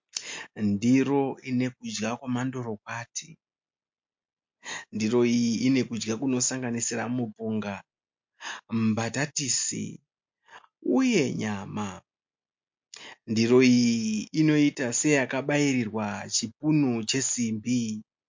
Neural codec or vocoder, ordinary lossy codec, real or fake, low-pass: none; MP3, 48 kbps; real; 7.2 kHz